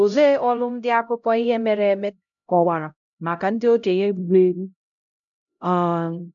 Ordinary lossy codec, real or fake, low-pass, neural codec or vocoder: none; fake; 7.2 kHz; codec, 16 kHz, 0.5 kbps, X-Codec, HuBERT features, trained on LibriSpeech